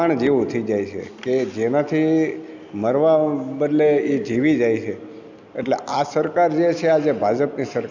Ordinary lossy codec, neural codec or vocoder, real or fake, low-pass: none; none; real; 7.2 kHz